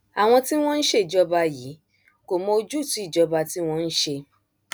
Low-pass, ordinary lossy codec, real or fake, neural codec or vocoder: none; none; real; none